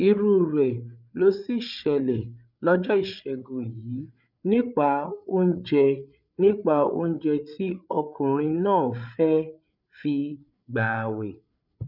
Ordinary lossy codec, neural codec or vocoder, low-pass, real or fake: none; codec, 16 kHz, 8 kbps, FreqCodec, larger model; 5.4 kHz; fake